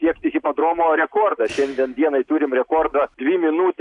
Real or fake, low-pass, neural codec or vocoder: real; 10.8 kHz; none